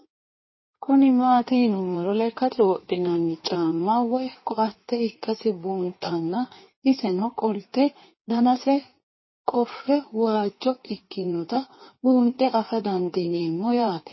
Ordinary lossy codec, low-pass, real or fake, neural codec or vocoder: MP3, 24 kbps; 7.2 kHz; fake; codec, 16 kHz in and 24 kHz out, 1.1 kbps, FireRedTTS-2 codec